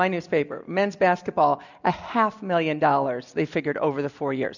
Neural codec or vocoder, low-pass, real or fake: none; 7.2 kHz; real